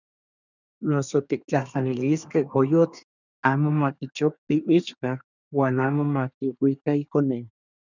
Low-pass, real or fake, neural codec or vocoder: 7.2 kHz; fake; codec, 24 kHz, 1 kbps, SNAC